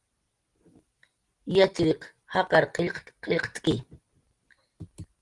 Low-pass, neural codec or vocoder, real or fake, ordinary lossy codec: 10.8 kHz; none; real; Opus, 24 kbps